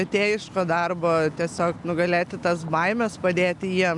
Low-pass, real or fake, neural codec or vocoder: 10.8 kHz; real; none